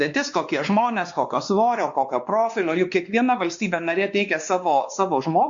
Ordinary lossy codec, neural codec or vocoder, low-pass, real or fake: Opus, 64 kbps; codec, 16 kHz, 2 kbps, X-Codec, WavLM features, trained on Multilingual LibriSpeech; 7.2 kHz; fake